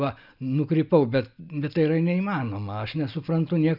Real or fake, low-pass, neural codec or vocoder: real; 5.4 kHz; none